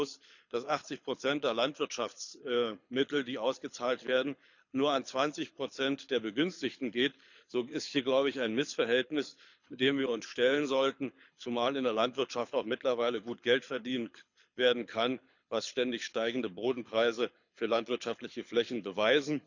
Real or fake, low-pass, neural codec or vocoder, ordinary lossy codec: fake; 7.2 kHz; codec, 24 kHz, 6 kbps, HILCodec; none